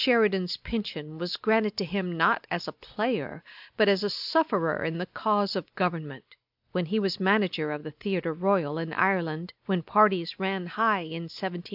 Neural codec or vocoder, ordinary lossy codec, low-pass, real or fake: none; AAC, 48 kbps; 5.4 kHz; real